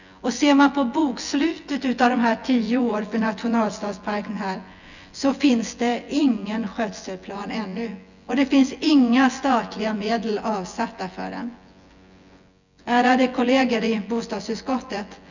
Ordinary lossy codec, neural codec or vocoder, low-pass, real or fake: none; vocoder, 24 kHz, 100 mel bands, Vocos; 7.2 kHz; fake